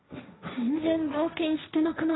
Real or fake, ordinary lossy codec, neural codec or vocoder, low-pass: fake; AAC, 16 kbps; codec, 16 kHz, 1.1 kbps, Voila-Tokenizer; 7.2 kHz